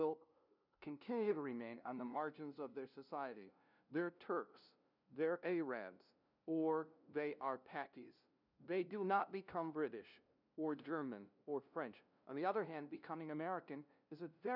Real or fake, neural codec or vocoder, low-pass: fake; codec, 16 kHz, 0.5 kbps, FunCodec, trained on LibriTTS, 25 frames a second; 5.4 kHz